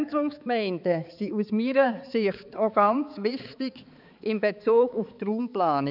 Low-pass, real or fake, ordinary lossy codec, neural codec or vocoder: 5.4 kHz; fake; none; codec, 16 kHz, 4 kbps, X-Codec, HuBERT features, trained on balanced general audio